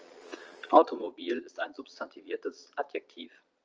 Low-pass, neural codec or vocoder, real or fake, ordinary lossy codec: 7.2 kHz; vocoder, 22.05 kHz, 80 mel bands, Vocos; fake; Opus, 24 kbps